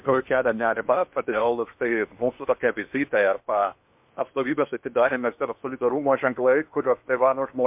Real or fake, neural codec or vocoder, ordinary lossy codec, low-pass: fake; codec, 16 kHz in and 24 kHz out, 0.8 kbps, FocalCodec, streaming, 65536 codes; MP3, 32 kbps; 3.6 kHz